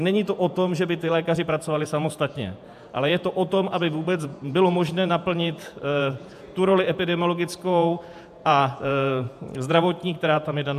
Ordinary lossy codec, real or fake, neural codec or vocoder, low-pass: AAC, 96 kbps; fake; vocoder, 48 kHz, 128 mel bands, Vocos; 14.4 kHz